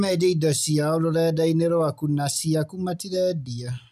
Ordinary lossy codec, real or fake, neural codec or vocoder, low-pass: none; real; none; 14.4 kHz